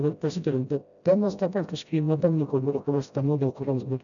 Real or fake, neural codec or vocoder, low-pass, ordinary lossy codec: fake; codec, 16 kHz, 0.5 kbps, FreqCodec, smaller model; 7.2 kHz; MP3, 96 kbps